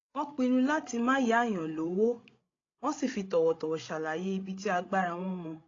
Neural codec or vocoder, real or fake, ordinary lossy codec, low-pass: codec, 16 kHz, 16 kbps, FreqCodec, larger model; fake; AAC, 32 kbps; 7.2 kHz